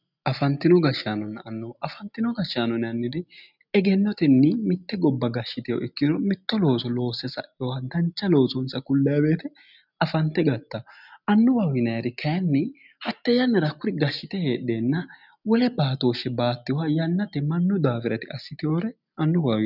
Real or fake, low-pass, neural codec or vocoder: real; 5.4 kHz; none